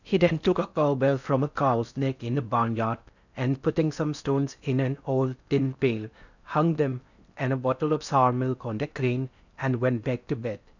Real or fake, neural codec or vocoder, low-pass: fake; codec, 16 kHz in and 24 kHz out, 0.6 kbps, FocalCodec, streaming, 4096 codes; 7.2 kHz